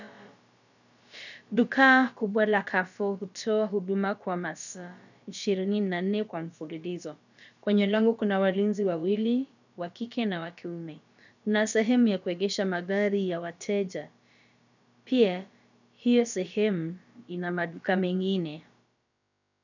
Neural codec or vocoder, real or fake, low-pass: codec, 16 kHz, about 1 kbps, DyCAST, with the encoder's durations; fake; 7.2 kHz